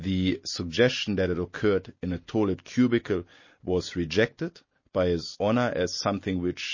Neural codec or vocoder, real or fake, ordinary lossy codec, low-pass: none; real; MP3, 32 kbps; 7.2 kHz